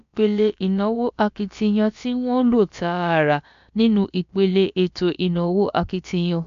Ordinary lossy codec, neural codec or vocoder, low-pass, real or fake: MP3, 64 kbps; codec, 16 kHz, about 1 kbps, DyCAST, with the encoder's durations; 7.2 kHz; fake